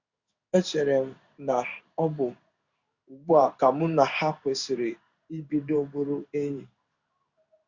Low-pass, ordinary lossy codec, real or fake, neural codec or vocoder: 7.2 kHz; Opus, 64 kbps; fake; codec, 16 kHz in and 24 kHz out, 1 kbps, XY-Tokenizer